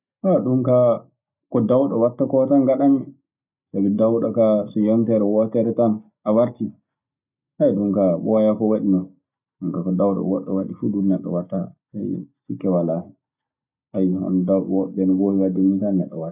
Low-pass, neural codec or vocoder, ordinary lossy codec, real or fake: 3.6 kHz; none; none; real